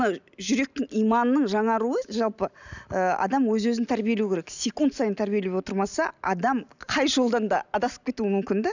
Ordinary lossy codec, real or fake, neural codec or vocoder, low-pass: none; real; none; 7.2 kHz